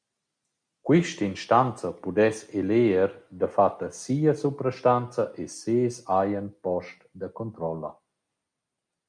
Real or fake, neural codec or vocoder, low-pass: real; none; 9.9 kHz